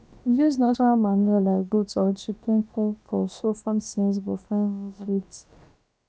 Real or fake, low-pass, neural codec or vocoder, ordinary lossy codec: fake; none; codec, 16 kHz, about 1 kbps, DyCAST, with the encoder's durations; none